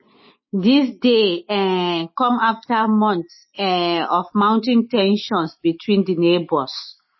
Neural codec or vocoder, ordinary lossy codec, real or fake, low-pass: none; MP3, 24 kbps; real; 7.2 kHz